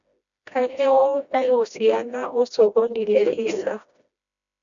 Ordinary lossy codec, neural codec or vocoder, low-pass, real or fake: none; codec, 16 kHz, 1 kbps, FreqCodec, smaller model; 7.2 kHz; fake